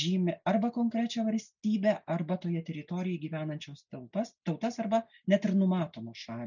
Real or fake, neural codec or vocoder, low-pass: real; none; 7.2 kHz